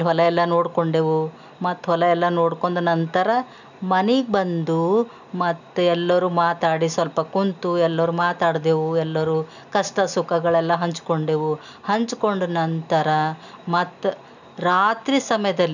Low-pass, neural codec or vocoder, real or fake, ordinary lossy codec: 7.2 kHz; none; real; none